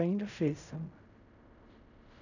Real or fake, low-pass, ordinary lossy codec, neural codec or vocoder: fake; 7.2 kHz; none; codec, 16 kHz in and 24 kHz out, 0.4 kbps, LongCat-Audio-Codec, fine tuned four codebook decoder